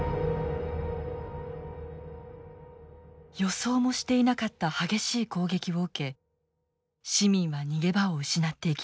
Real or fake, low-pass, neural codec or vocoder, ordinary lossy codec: real; none; none; none